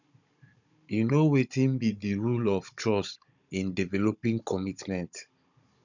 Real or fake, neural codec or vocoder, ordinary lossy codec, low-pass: fake; codec, 16 kHz, 16 kbps, FunCodec, trained on Chinese and English, 50 frames a second; none; 7.2 kHz